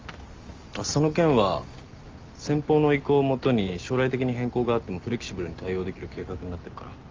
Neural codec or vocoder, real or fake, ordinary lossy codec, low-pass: none; real; Opus, 32 kbps; 7.2 kHz